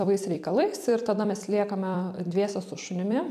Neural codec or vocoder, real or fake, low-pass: vocoder, 44.1 kHz, 128 mel bands every 256 samples, BigVGAN v2; fake; 14.4 kHz